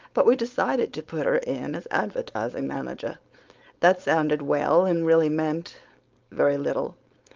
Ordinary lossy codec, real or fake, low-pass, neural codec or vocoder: Opus, 24 kbps; fake; 7.2 kHz; codec, 16 kHz, 4.8 kbps, FACodec